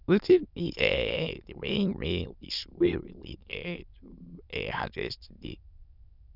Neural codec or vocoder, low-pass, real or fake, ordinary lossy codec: autoencoder, 22.05 kHz, a latent of 192 numbers a frame, VITS, trained on many speakers; 5.4 kHz; fake; none